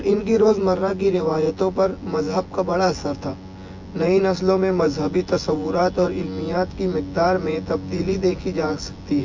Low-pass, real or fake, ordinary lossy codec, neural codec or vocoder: 7.2 kHz; fake; MP3, 48 kbps; vocoder, 24 kHz, 100 mel bands, Vocos